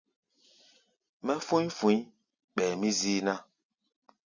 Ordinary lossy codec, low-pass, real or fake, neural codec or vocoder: Opus, 64 kbps; 7.2 kHz; real; none